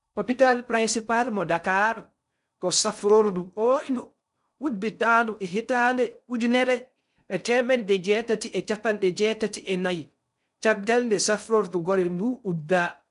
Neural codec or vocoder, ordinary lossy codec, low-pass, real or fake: codec, 16 kHz in and 24 kHz out, 0.6 kbps, FocalCodec, streaming, 4096 codes; none; 10.8 kHz; fake